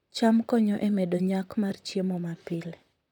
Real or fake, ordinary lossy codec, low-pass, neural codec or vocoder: fake; none; 19.8 kHz; vocoder, 44.1 kHz, 128 mel bands, Pupu-Vocoder